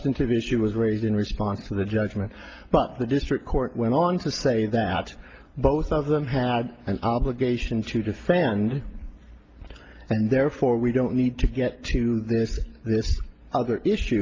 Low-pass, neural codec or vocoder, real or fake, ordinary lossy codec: 7.2 kHz; none; real; Opus, 32 kbps